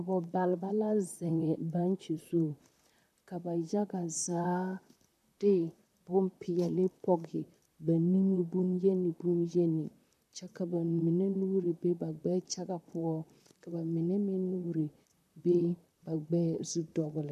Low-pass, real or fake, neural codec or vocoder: 14.4 kHz; fake; vocoder, 44.1 kHz, 128 mel bands, Pupu-Vocoder